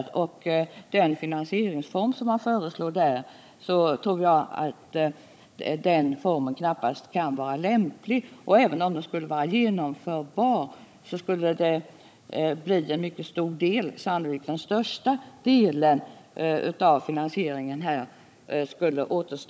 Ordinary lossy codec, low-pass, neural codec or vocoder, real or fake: none; none; codec, 16 kHz, 16 kbps, FunCodec, trained on Chinese and English, 50 frames a second; fake